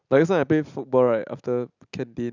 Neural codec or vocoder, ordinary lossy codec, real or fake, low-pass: none; none; real; 7.2 kHz